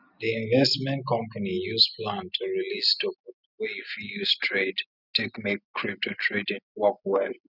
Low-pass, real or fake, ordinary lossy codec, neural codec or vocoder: 5.4 kHz; real; none; none